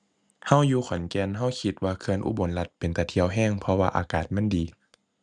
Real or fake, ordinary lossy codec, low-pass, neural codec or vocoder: real; none; none; none